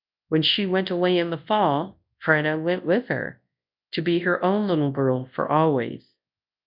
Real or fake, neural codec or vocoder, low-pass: fake; codec, 24 kHz, 0.9 kbps, WavTokenizer, large speech release; 5.4 kHz